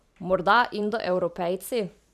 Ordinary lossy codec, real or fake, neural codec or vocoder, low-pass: none; fake; vocoder, 44.1 kHz, 128 mel bands every 512 samples, BigVGAN v2; 14.4 kHz